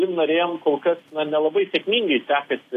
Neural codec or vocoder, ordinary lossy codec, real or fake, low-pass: none; AAC, 64 kbps; real; 14.4 kHz